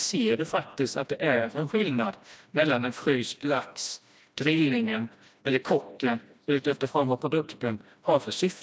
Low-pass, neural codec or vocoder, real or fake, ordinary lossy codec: none; codec, 16 kHz, 1 kbps, FreqCodec, smaller model; fake; none